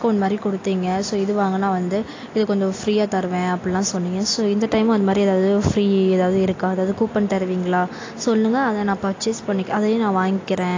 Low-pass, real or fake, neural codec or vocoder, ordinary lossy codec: 7.2 kHz; real; none; AAC, 32 kbps